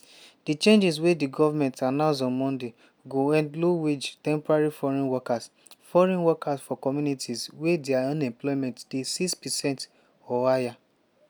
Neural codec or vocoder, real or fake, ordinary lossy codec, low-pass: none; real; none; none